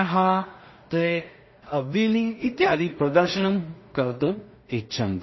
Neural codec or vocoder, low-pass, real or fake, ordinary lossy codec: codec, 16 kHz in and 24 kHz out, 0.4 kbps, LongCat-Audio-Codec, two codebook decoder; 7.2 kHz; fake; MP3, 24 kbps